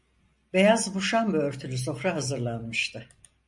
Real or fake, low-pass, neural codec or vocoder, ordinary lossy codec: real; 10.8 kHz; none; MP3, 48 kbps